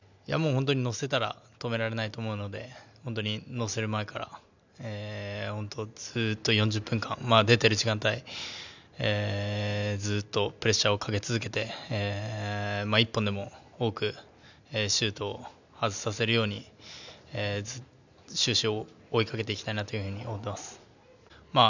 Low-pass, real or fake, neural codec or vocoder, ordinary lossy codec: 7.2 kHz; real; none; none